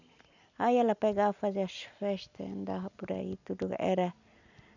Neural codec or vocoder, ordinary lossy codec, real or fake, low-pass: none; none; real; 7.2 kHz